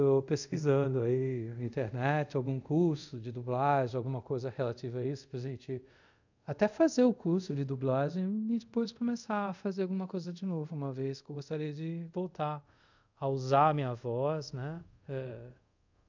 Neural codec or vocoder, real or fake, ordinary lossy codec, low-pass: codec, 24 kHz, 0.5 kbps, DualCodec; fake; none; 7.2 kHz